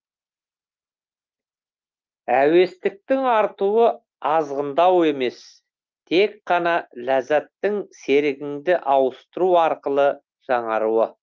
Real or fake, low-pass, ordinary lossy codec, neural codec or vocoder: real; 7.2 kHz; Opus, 32 kbps; none